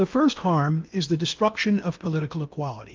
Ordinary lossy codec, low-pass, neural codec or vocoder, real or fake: Opus, 24 kbps; 7.2 kHz; codec, 16 kHz in and 24 kHz out, 0.8 kbps, FocalCodec, streaming, 65536 codes; fake